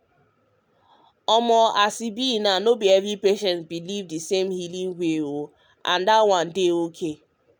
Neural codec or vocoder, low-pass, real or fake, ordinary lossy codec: none; none; real; none